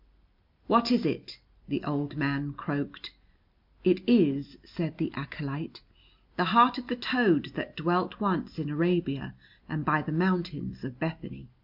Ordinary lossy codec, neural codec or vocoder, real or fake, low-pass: MP3, 48 kbps; none; real; 5.4 kHz